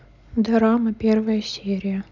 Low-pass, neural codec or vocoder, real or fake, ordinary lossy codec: 7.2 kHz; none; real; none